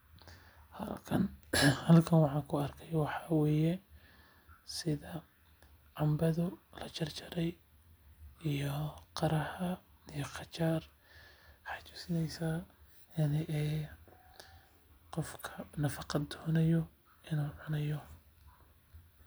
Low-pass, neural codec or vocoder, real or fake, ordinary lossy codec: none; none; real; none